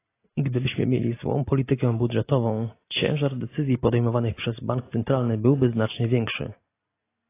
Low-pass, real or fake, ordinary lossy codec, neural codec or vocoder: 3.6 kHz; real; AAC, 24 kbps; none